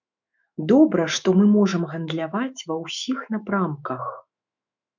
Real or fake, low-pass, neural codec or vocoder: fake; 7.2 kHz; autoencoder, 48 kHz, 128 numbers a frame, DAC-VAE, trained on Japanese speech